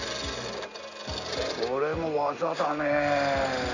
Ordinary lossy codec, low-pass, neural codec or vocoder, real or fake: none; 7.2 kHz; none; real